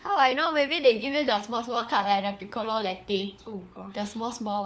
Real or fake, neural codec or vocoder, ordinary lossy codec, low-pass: fake; codec, 16 kHz, 4 kbps, FunCodec, trained on LibriTTS, 50 frames a second; none; none